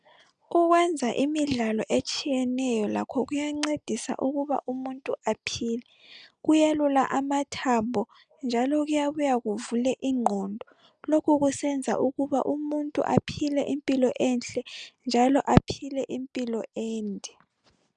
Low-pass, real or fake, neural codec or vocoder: 9.9 kHz; real; none